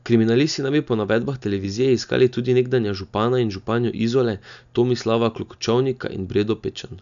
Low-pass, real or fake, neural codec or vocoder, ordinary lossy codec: 7.2 kHz; real; none; none